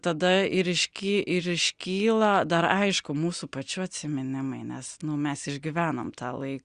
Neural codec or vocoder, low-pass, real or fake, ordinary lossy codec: none; 9.9 kHz; real; Opus, 64 kbps